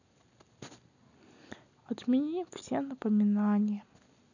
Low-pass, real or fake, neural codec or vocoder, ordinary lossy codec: 7.2 kHz; real; none; none